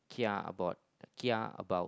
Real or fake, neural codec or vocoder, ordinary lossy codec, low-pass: real; none; none; none